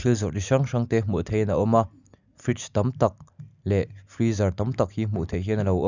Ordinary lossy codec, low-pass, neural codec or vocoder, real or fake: none; 7.2 kHz; none; real